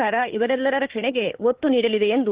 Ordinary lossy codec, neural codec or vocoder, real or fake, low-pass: Opus, 16 kbps; codec, 16 kHz, 4 kbps, FunCodec, trained on Chinese and English, 50 frames a second; fake; 3.6 kHz